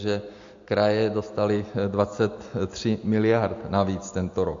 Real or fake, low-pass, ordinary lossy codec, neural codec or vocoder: real; 7.2 kHz; MP3, 48 kbps; none